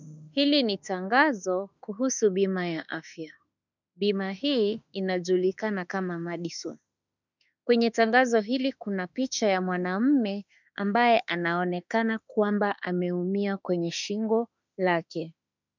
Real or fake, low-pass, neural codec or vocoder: fake; 7.2 kHz; autoencoder, 48 kHz, 32 numbers a frame, DAC-VAE, trained on Japanese speech